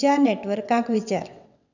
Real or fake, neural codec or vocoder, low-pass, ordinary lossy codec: fake; vocoder, 22.05 kHz, 80 mel bands, WaveNeXt; 7.2 kHz; none